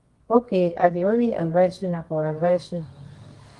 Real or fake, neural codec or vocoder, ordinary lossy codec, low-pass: fake; codec, 24 kHz, 0.9 kbps, WavTokenizer, medium music audio release; Opus, 24 kbps; 10.8 kHz